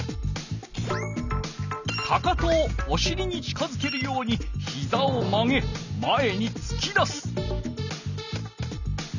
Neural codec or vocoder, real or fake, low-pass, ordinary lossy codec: none; real; 7.2 kHz; none